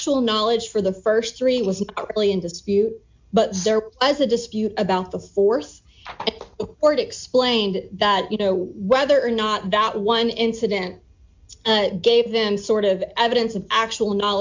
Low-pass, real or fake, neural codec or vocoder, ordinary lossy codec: 7.2 kHz; real; none; MP3, 64 kbps